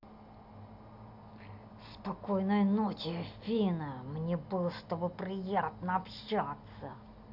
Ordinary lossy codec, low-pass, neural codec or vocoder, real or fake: none; 5.4 kHz; none; real